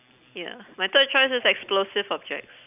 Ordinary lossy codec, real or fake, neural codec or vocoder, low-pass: none; real; none; 3.6 kHz